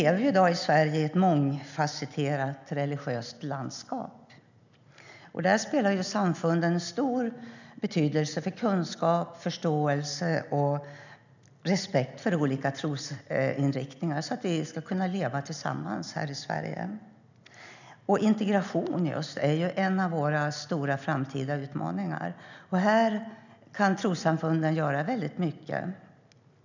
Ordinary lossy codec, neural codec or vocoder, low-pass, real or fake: none; none; 7.2 kHz; real